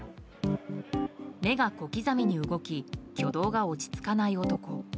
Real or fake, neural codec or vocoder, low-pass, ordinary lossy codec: real; none; none; none